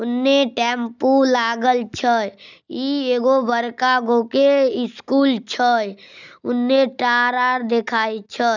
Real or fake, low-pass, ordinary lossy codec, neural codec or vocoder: real; 7.2 kHz; none; none